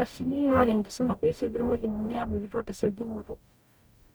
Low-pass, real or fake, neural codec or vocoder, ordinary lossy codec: none; fake; codec, 44.1 kHz, 0.9 kbps, DAC; none